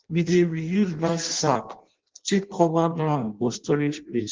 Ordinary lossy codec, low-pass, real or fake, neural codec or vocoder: Opus, 16 kbps; 7.2 kHz; fake; codec, 16 kHz in and 24 kHz out, 0.6 kbps, FireRedTTS-2 codec